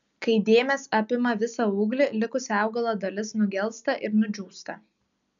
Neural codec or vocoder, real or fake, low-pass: none; real; 7.2 kHz